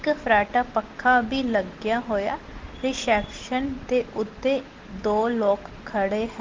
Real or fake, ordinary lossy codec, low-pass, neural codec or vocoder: real; Opus, 16 kbps; 7.2 kHz; none